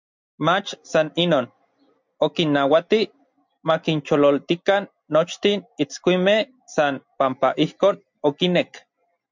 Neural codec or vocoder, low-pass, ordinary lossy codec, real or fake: none; 7.2 kHz; MP3, 64 kbps; real